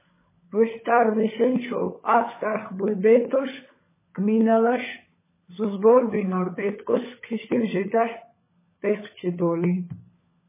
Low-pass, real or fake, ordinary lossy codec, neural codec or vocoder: 3.6 kHz; fake; MP3, 16 kbps; codec, 16 kHz, 16 kbps, FunCodec, trained on LibriTTS, 50 frames a second